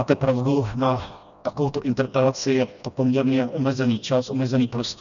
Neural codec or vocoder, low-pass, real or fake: codec, 16 kHz, 1 kbps, FreqCodec, smaller model; 7.2 kHz; fake